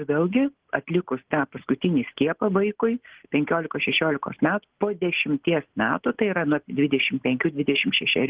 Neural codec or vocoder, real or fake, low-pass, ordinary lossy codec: none; real; 3.6 kHz; Opus, 16 kbps